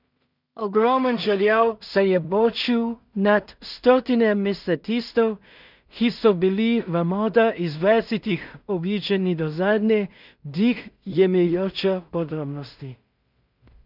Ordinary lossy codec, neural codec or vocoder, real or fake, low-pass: none; codec, 16 kHz in and 24 kHz out, 0.4 kbps, LongCat-Audio-Codec, two codebook decoder; fake; 5.4 kHz